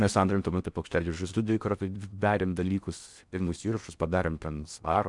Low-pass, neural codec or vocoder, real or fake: 10.8 kHz; codec, 16 kHz in and 24 kHz out, 0.6 kbps, FocalCodec, streaming, 4096 codes; fake